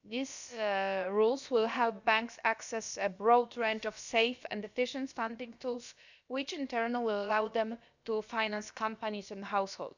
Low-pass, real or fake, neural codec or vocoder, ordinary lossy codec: 7.2 kHz; fake; codec, 16 kHz, about 1 kbps, DyCAST, with the encoder's durations; none